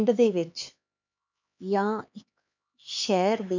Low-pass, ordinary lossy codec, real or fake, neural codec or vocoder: 7.2 kHz; none; fake; codec, 16 kHz, 4 kbps, X-Codec, HuBERT features, trained on LibriSpeech